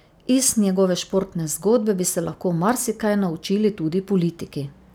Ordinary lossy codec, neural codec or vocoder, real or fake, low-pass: none; none; real; none